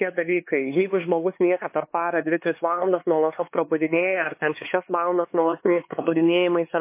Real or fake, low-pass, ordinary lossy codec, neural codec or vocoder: fake; 3.6 kHz; MP3, 24 kbps; codec, 16 kHz, 4 kbps, X-Codec, HuBERT features, trained on LibriSpeech